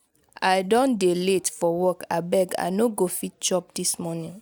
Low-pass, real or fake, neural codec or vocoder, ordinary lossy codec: none; real; none; none